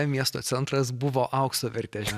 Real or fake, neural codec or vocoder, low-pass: real; none; 14.4 kHz